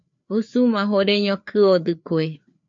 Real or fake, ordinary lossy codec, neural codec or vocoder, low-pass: fake; MP3, 48 kbps; codec, 16 kHz, 4 kbps, FreqCodec, larger model; 7.2 kHz